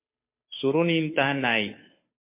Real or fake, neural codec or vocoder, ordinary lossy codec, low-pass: fake; codec, 16 kHz, 2 kbps, FunCodec, trained on Chinese and English, 25 frames a second; MP3, 24 kbps; 3.6 kHz